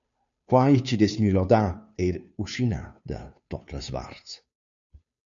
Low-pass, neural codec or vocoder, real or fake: 7.2 kHz; codec, 16 kHz, 2 kbps, FunCodec, trained on Chinese and English, 25 frames a second; fake